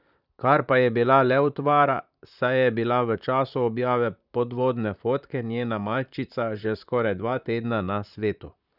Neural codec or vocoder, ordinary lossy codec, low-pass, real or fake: vocoder, 44.1 kHz, 128 mel bands, Pupu-Vocoder; none; 5.4 kHz; fake